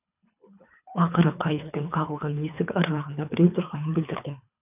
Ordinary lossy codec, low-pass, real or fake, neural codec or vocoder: none; 3.6 kHz; fake; codec, 24 kHz, 3 kbps, HILCodec